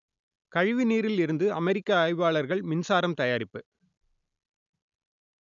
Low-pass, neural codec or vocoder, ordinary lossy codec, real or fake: 7.2 kHz; none; none; real